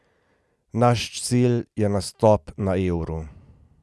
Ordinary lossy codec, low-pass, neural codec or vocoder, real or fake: none; none; none; real